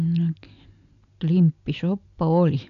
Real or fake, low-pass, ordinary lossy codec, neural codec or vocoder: real; 7.2 kHz; none; none